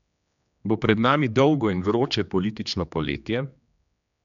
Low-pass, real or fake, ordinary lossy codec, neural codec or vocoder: 7.2 kHz; fake; none; codec, 16 kHz, 2 kbps, X-Codec, HuBERT features, trained on general audio